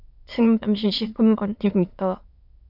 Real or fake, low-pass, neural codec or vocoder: fake; 5.4 kHz; autoencoder, 22.05 kHz, a latent of 192 numbers a frame, VITS, trained on many speakers